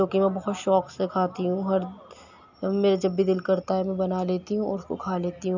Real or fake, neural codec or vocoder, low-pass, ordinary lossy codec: real; none; 7.2 kHz; none